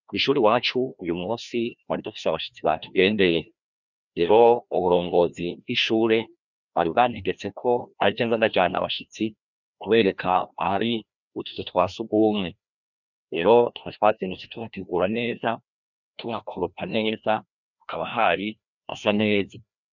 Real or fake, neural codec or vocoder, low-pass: fake; codec, 16 kHz, 1 kbps, FreqCodec, larger model; 7.2 kHz